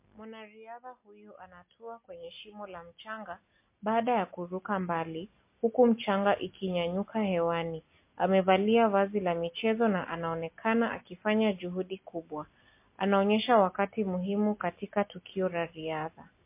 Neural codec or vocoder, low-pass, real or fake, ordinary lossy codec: none; 3.6 kHz; real; MP3, 24 kbps